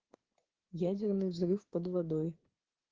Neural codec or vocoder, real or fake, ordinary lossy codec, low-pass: codec, 16 kHz, 6 kbps, DAC; fake; Opus, 16 kbps; 7.2 kHz